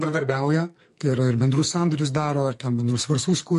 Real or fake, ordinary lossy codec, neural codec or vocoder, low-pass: fake; MP3, 48 kbps; codec, 44.1 kHz, 2.6 kbps, SNAC; 14.4 kHz